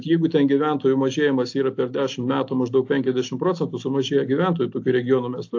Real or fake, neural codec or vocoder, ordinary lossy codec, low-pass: real; none; AAC, 48 kbps; 7.2 kHz